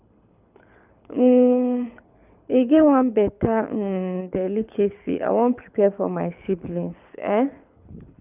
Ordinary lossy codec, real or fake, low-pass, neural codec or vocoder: none; fake; 3.6 kHz; codec, 24 kHz, 6 kbps, HILCodec